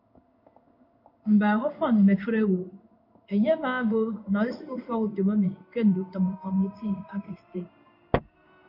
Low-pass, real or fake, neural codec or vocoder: 5.4 kHz; fake; codec, 16 kHz in and 24 kHz out, 1 kbps, XY-Tokenizer